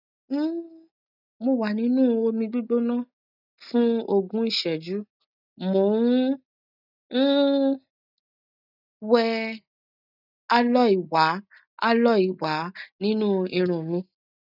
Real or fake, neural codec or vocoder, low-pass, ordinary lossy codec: real; none; 5.4 kHz; none